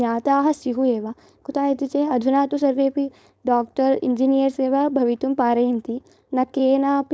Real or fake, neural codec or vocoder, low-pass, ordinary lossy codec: fake; codec, 16 kHz, 4.8 kbps, FACodec; none; none